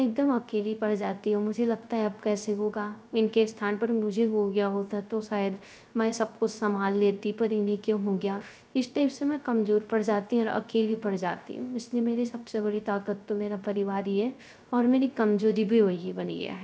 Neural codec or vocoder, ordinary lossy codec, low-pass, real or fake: codec, 16 kHz, 0.3 kbps, FocalCodec; none; none; fake